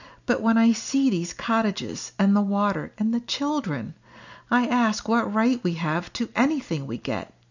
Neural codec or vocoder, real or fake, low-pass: none; real; 7.2 kHz